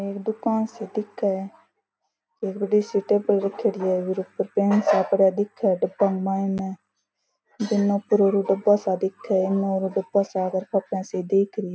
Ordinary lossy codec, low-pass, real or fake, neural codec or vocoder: none; none; real; none